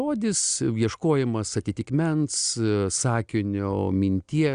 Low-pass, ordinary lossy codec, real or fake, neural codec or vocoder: 9.9 kHz; Opus, 64 kbps; real; none